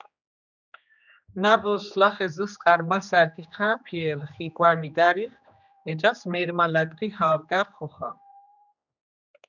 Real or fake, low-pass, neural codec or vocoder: fake; 7.2 kHz; codec, 16 kHz, 2 kbps, X-Codec, HuBERT features, trained on general audio